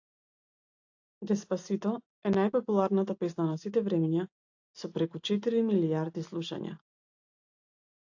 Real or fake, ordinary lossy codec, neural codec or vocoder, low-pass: real; AAC, 48 kbps; none; 7.2 kHz